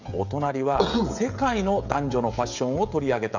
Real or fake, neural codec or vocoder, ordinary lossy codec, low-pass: fake; codec, 16 kHz, 16 kbps, FreqCodec, smaller model; none; 7.2 kHz